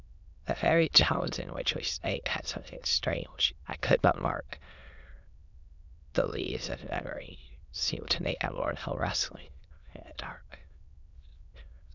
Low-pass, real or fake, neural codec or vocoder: 7.2 kHz; fake; autoencoder, 22.05 kHz, a latent of 192 numbers a frame, VITS, trained on many speakers